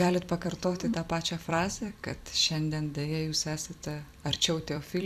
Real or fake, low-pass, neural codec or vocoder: fake; 14.4 kHz; vocoder, 44.1 kHz, 128 mel bands every 256 samples, BigVGAN v2